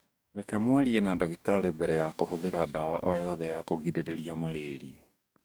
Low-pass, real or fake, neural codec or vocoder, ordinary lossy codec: none; fake; codec, 44.1 kHz, 2.6 kbps, DAC; none